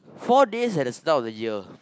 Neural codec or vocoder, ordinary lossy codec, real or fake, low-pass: none; none; real; none